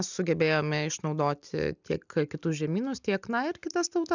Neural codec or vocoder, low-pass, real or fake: none; 7.2 kHz; real